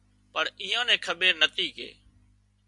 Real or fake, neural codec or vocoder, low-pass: real; none; 10.8 kHz